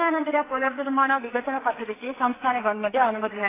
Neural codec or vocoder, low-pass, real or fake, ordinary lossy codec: codec, 44.1 kHz, 2.6 kbps, SNAC; 3.6 kHz; fake; AAC, 16 kbps